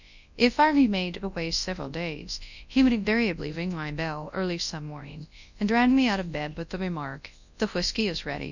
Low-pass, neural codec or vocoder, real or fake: 7.2 kHz; codec, 24 kHz, 0.9 kbps, WavTokenizer, large speech release; fake